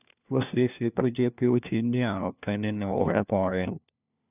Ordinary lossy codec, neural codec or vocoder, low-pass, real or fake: none; codec, 16 kHz, 1 kbps, FunCodec, trained on LibriTTS, 50 frames a second; 3.6 kHz; fake